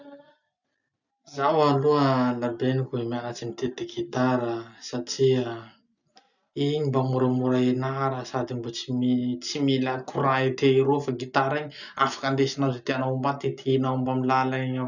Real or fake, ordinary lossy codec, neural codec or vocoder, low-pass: real; none; none; 7.2 kHz